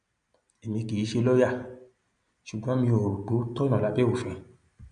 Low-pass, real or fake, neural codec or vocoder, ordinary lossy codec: 9.9 kHz; real; none; AAC, 96 kbps